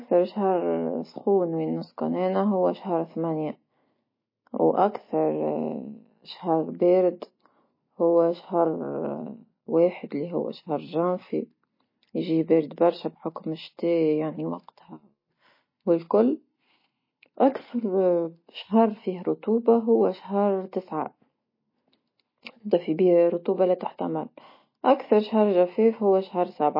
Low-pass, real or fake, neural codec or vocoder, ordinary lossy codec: 5.4 kHz; real; none; MP3, 24 kbps